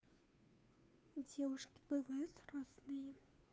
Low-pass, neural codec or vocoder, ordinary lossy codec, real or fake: none; codec, 16 kHz, 2 kbps, FunCodec, trained on Chinese and English, 25 frames a second; none; fake